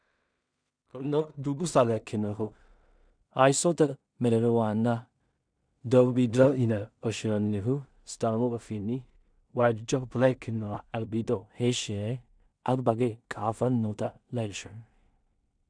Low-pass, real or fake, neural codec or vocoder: 9.9 kHz; fake; codec, 16 kHz in and 24 kHz out, 0.4 kbps, LongCat-Audio-Codec, two codebook decoder